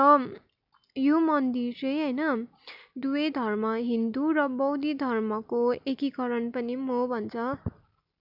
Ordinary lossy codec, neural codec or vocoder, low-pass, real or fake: none; none; 5.4 kHz; real